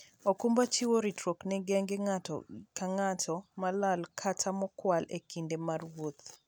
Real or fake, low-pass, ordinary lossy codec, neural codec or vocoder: real; none; none; none